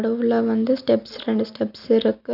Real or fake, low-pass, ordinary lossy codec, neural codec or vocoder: real; 5.4 kHz; none; none